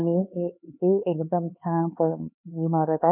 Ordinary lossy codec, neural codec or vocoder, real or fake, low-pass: none; codec, 16 kHz, 4 kbps, X-Codec, HuBERT features, trained on LibriSpeech; fake; 3.6 kHz